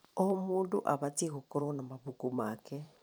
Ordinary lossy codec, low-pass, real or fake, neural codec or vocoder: none; none; fake; vocoder, 44.1 kHz, 128 mel bands every 512 samples, BigVGAN v2